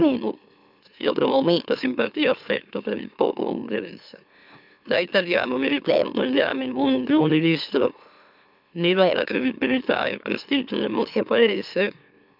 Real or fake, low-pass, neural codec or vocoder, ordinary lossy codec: fake; 5.4 kHz; autoencoder, 44.1 kHz, a latent of 192 numbers a frame, MeloTTS; none